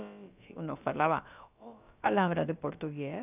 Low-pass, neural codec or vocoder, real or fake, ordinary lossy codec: 3.6 kHz; codec, 16 kHz, about 1 kbps, DyCAST, with the encoder's durations; fake; none